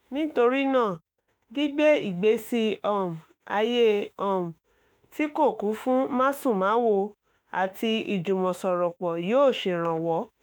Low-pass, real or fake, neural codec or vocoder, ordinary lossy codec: none; fake; autoencoder, 48 kHz, 32 numbers a frame, DAC-VAE, trained on Japanese speech; none